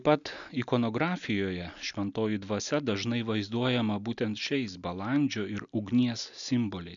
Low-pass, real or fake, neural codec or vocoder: 7.2 kHz; real; none